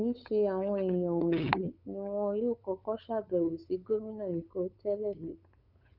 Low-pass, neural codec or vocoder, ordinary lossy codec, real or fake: 5.4 kHz; codec, 16 kHz, 8 kbps, FunCodec, trained on LibriTTS, 25 frames a second; none; fake